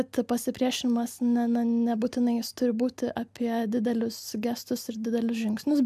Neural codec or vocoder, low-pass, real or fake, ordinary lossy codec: none; 14.4 kHz; real; AAC, 96 kbps